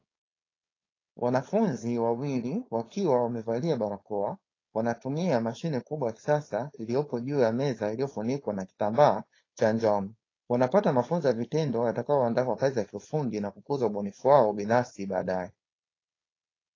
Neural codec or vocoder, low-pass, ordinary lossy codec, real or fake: codec, 16 kHz, 4.8 kbps, FACodec; 7.2 kHz; AAC, 32 kbps; fake